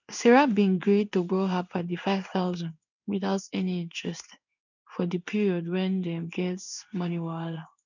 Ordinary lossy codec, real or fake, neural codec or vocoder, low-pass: none; fake; codec, 16 kHz in and 24 kHz out, 1 kbps, XY-Tokenizer; 7.2 kHz